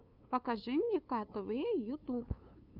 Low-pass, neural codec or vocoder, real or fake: 5.4 kHz; codec, 16 kHz, 2 kbps, FunCodec, trained on LibriTTS, 25 frames a second; fake